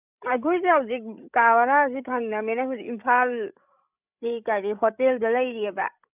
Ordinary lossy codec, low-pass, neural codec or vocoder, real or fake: none; 3.6 kHz; codec, 16 kHz, 16 kbps, FreqCodec, larger model; fake